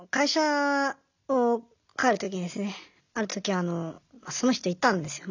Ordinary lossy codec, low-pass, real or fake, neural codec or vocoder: none; 7.2 kHz; real; none